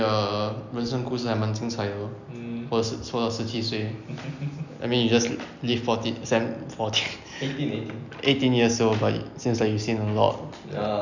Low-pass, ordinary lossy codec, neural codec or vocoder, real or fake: 7.2 kHz; none; none; real